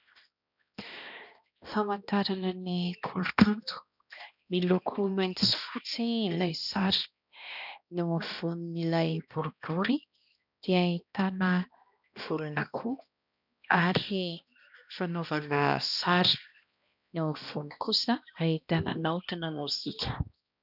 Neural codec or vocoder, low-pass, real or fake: codec, 16 kHz, 1 kbps, X-Codec, HuBERT features, trained on balanced general audio; 5.4 kHz; fake